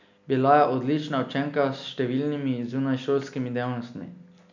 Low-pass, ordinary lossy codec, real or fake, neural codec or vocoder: 7.2 kHz; none; real; none